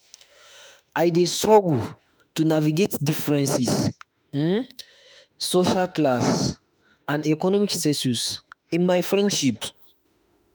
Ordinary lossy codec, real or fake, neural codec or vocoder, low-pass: none; fake; autoencoder, 48 kHz, 32 numbers a frame, DAC-VAE, trained on Japanese speech; none